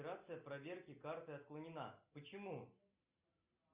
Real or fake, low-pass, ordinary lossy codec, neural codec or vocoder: real; 3.6 kHz; Opus, 64 kbps; none